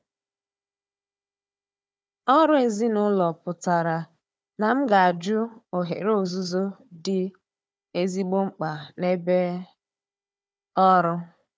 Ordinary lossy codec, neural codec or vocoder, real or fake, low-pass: none; codec, 16 kHz, 4 kbps, FunCodec, trained on Chinese and English, 50 frames a second; fake; none